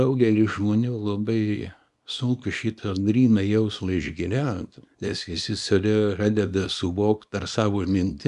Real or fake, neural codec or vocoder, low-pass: fake; codec, 24 kHz, 0.9 kbps, WavTokenizer, small release; 10.8 kHz